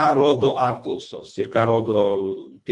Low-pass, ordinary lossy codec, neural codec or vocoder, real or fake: 10.8 kHz; MP3, 64 kbps; codec, 24 kHz, 1.5 kbps, HILCodec; fake